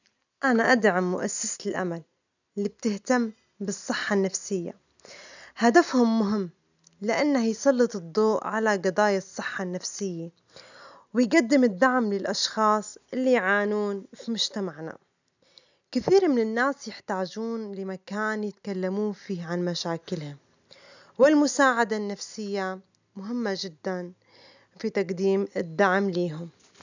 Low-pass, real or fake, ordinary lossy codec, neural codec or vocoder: 7.2 kHz; real; none; none